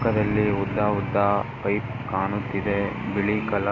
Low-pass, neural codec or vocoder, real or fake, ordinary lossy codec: 7.2 kHz; none; real; MP3, 32 kbps